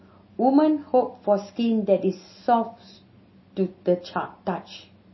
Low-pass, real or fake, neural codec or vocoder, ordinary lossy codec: 7.2 kHz; real; none; MP3, 24 kbps